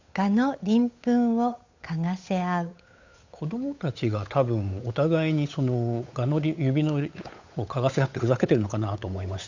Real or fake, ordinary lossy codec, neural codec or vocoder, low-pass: fake; AAC, 48 kbps; codec, 16 kHz, 8 kbps, FunCodec, trained on Chinese and English, 25 frames a second; 7.2 kHz